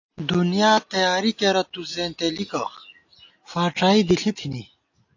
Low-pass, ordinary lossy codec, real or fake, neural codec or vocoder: 7.2 kHz; AAC, 48 kbps; real; none